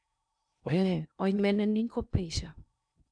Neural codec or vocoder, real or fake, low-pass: codec, 16 kHz in and 24 kHz out, 0.8 kbps, FocalCodec, streaming, 65536 codes; fake; 9.9 kHz